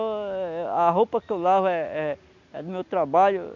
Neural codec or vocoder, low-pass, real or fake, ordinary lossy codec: none; 7.2 kHz; real; MP3, 64 kbps